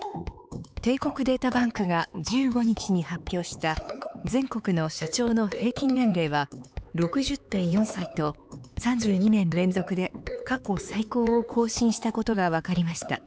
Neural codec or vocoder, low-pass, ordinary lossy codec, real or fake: codec, 16 kHz, 4 kbps, X-Codec, HuBERT features, trained on LibriSpeech; none; none; fake